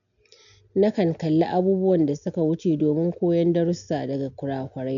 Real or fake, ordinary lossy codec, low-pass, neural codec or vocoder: real; none; 7.2 kHz; none